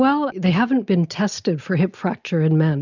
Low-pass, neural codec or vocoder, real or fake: 7.2 kHz; none; real